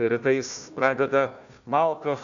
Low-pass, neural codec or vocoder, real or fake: 7.2 kHz; codec, 16 kHz, 1 kbps, FunCodec, trained on Chinese and English, 50 frames a second; fake